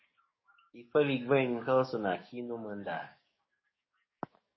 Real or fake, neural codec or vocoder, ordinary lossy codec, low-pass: fake; codec, 44.1 kHz, 7.8 kbps, DAC; MP3, 24 kbps; 7.2 kHz